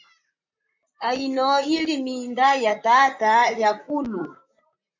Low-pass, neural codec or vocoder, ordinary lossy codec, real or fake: 7.2 kHz; vocoder, 44.1 kHz, 128 mel bands, Pupu-Vocoder; MP3, 64 kbps; fake